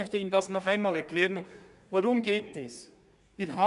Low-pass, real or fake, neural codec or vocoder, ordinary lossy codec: 10.8 kHz; fake; codec, 24 kHz, 1 kbps, SNAC; none